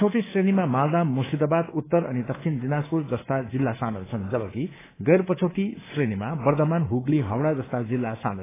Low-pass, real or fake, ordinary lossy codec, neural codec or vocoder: 3.6 kHz; fake; AAC, 16 kbps; codec, 24 kHz, 3.1 kbps, DualCodec